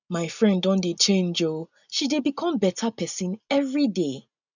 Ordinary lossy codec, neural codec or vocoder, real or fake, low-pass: none; none; real; 7.2 kHz